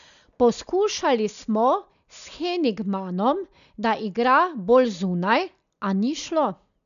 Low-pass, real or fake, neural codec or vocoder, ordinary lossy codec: 7.2 kHz; real; none; none